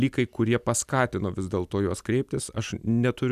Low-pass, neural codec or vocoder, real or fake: 14.4 kHz; none; real